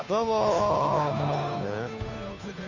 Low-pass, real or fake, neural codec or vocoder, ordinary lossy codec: 7.2 kHz; fake; codec, 16 kHz, 2 kbps, FunCodec, trained on Chinese and English, 25 frames a second; none